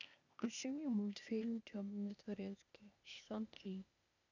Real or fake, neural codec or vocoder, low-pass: fake; codec, 16 kHz, 0.8 kbps, ZipCodec; 7.2 kHz